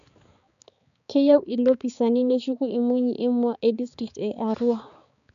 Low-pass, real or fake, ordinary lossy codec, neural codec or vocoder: 7.2 kHz; fake; none; codec, 16 kHz, 4 kbps, X-Codec, HuBERT features, trained on balanced general audio